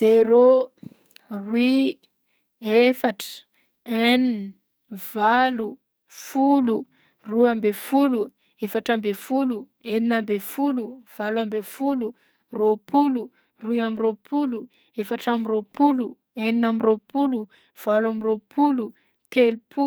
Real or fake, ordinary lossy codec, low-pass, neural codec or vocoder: fake; none; none; codec, 44.1 kHz, 2.6 kbps, SNAC